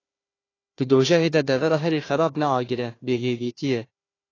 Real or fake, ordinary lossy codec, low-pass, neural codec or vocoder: fake; AAC, 32 kbps; 7.2 kHz; codec, 16 kHz, 1 kbps, FunCodec, trained on Chinese and English, 50 frames a second